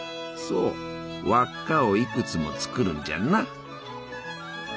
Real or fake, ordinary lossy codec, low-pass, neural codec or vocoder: real; none; none; none